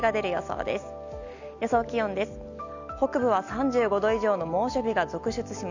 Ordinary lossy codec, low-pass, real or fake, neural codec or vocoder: none; 7.2 kHz; real; none